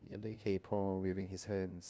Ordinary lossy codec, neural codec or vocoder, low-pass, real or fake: none; codec, 16 kHz, 0.5 kbps, FunCodec, trained on LibriTTS, 25 frames a second; none; fake